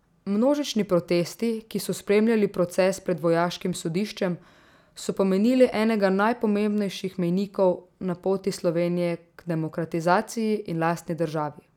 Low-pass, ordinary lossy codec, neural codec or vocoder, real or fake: 19.8 kHz; none; none; real